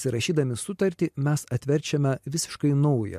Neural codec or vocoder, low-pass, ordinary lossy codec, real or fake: none; 14.4 kHz; MP3, 64 kbps; real